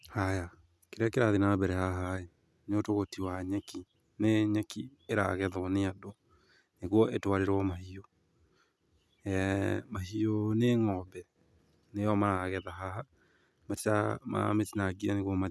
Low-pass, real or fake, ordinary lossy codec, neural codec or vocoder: none; real; none; none